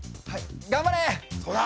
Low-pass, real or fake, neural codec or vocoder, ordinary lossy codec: none; real; none; none